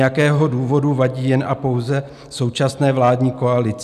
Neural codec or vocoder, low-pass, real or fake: none; 14.4 kHz; real